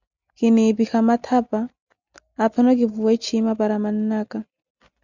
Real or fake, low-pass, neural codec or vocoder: real; 7.2 kHz; none